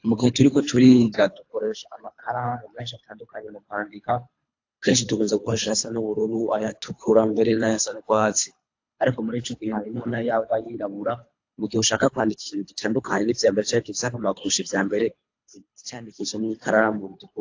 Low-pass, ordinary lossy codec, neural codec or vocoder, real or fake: 7.2 kHz; AAC, 48 kbps; codec, 24 kHz, 3 kbps, HILCodec; fake